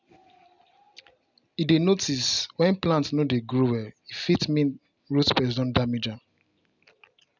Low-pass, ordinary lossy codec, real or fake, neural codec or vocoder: 7.2 kHz; none; real; none